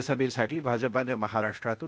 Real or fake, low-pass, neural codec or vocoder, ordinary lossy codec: fake; none; codec, 16 kHz, 0.8 kbps, ZipCodec; none